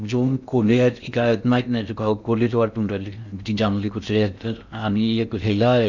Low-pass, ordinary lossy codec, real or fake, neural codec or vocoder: 7.2 kHz; none; fake; codec, 16 kHz in and 24 kHz out, 0.6 kbps, FocalCodec, streaming, 2048 codes